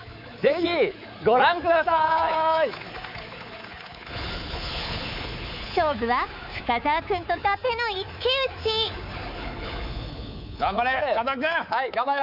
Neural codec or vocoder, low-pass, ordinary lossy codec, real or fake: codec, 24 kHz, 3.1 kbps, DualCodec; 5.4 kHz; none; fake